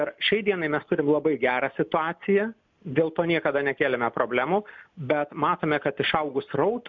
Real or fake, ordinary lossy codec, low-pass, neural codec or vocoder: real; MP3, 64 kbps; 7.2 kHz; none